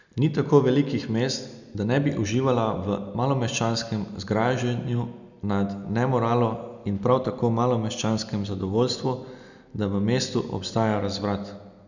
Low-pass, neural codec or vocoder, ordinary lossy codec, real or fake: 7.2 kHz; none; none; real